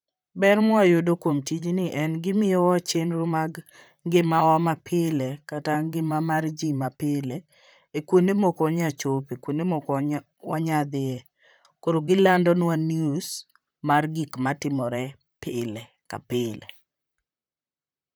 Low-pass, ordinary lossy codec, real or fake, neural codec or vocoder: none; none; fake; vocoder, 44.1 kHz, 128 mel bands, Pupu-Vocoder